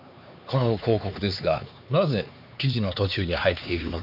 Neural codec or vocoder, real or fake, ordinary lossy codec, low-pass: codec, 16 kHz, 4 kbps, X-Codec, HuBERT features, trained on LibriSpeech; fake; none; 5.4 kHz